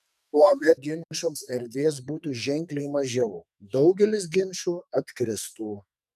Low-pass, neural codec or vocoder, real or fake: 14.4 kHz; codec, 44.1 kHz, 2.6 kbps, SNAC; fake